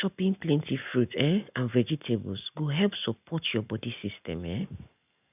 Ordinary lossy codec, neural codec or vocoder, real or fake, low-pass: none; none; real; 3.6 kHz